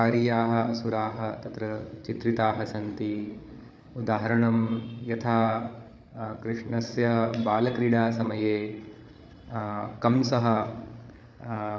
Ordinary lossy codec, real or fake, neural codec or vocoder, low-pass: none; fake; codec, 16 kHz, 8 kbps, FreqCodec, larger model; none